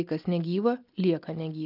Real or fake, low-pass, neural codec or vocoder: real; 5.4 kHz; none